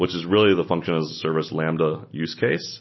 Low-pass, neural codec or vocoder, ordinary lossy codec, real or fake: 7.2 kHz; none; MP3, 24 kbps; real